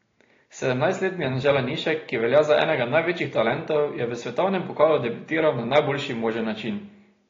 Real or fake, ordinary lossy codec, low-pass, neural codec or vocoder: real; AAC, 32 kbps; 7.2 kHz; none